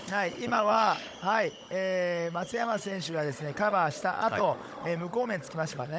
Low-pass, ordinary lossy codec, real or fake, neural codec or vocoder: none; none; fake; codec, 16 kHz, 16 kbps, FunCodec, trained on Chinese and English, 50 frames a second